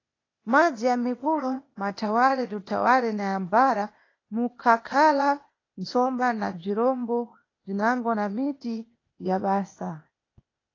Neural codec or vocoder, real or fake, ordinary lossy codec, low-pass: codec, 16 kHz, 0.8 kbps, ZipCodec; fake; AAC, 32 kbps; 7.2 kHz